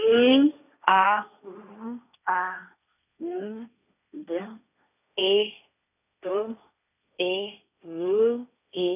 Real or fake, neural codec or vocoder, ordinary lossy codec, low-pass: fake; codec, 16 kHz, 1.1 kbps, Voila-Tokenizer; none; 3.6 kHz